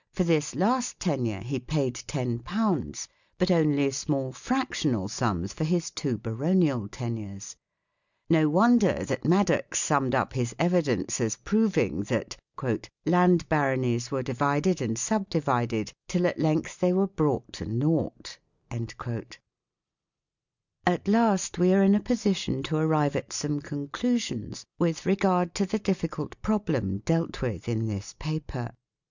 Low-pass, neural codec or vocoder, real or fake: 7.2 kHz; none; real